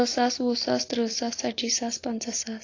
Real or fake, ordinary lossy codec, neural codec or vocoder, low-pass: real; AAC, 32 kbps; none; 7.2 kHz